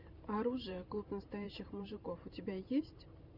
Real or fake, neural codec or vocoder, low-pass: fake; vocoder, 44.1 kHz, 128 mel bands every 512 samples, BigVGAN v2; 5.4 kHz